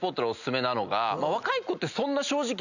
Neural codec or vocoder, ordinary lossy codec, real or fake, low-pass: none; none; real; 7.2 kHz